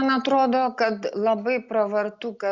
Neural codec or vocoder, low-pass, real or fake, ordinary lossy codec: none; 7.2 kHz; real; Opus, 64 kbps